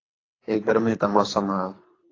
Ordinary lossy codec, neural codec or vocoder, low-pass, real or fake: AAC, 32 kbps; codec, 24 kHz, 3 kbps, HILCodec; 7.2 kHz; fake